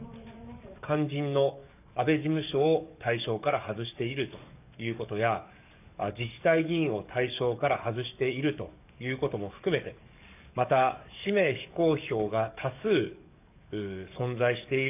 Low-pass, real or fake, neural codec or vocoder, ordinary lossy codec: 3.6 kHz; fake; codec, 44.1 kHz, 7.8 kbps, DAC; none